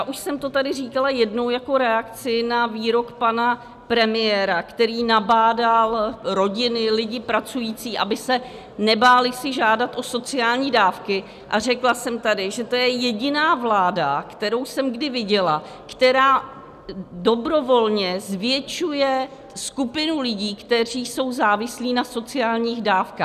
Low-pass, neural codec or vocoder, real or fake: 14.4 kHz; none; real